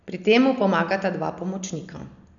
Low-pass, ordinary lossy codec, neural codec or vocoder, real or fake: 7.2 kHz; none; none; real